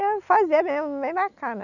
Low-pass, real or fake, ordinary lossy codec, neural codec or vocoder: 7.2 kHz; real; none; none